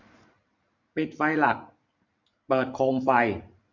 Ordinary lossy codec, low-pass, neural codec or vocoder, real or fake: none; 7.2 kHz; vocoder, 44.1 kHz, 128 mel bands every 512 samples, BigVGAN v2; fake